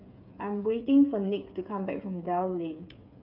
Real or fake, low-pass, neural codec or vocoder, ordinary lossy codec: fake; 5.4 kHz; codec, 16 kHz, 8 kbps, FreqCodec, smaller model; none